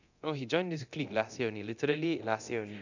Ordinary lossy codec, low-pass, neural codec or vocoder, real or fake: none; 7.2 kHz; codec, 24 kHz, 0.9 kbps, DualCodec; fake